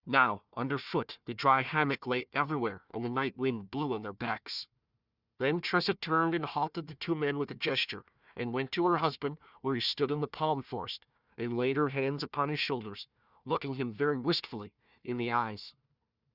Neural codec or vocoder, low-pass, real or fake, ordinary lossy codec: codec, 16 kHz, 1 kbps, FunCodec, trained on Chinese and English, 50 frames a second; 5.4 kHz; fake; Opus, 64 kbps